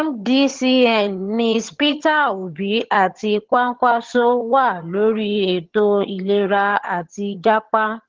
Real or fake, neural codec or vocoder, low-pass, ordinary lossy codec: fake; vocoder, 22.05 kHz, 80 mel bands, HiFi-GAN; 7.2 kHz; Opus, 16 kbps